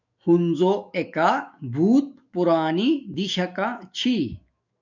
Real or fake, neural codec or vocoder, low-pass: fake; autoencoder, 48 kHz, 128 numbers a frame, DAC-VAE, trained on Japanese speech; 7.2 kHz